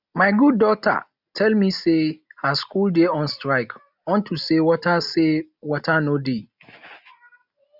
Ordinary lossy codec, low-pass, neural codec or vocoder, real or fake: none; 5.4 kHz; none; real